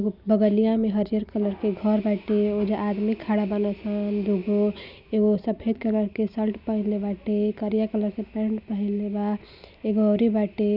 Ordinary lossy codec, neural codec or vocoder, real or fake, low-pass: none; none; real; 5.4 kHz